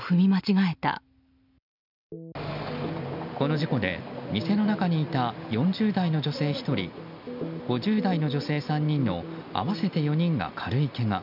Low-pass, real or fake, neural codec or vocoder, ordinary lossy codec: 5.4 kHz; real; none; none